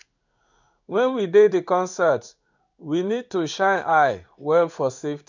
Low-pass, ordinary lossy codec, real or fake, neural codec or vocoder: 7.2 kHz; none; fake; codec, 16 kHz in and 24 kHz out, 1 kbps, XY-Tokenizer